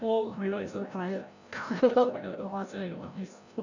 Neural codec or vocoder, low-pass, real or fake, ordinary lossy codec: codec, 16 kHz, 0.5 kbps, FreqCodec, larger model; 7.2 kHz; fake; none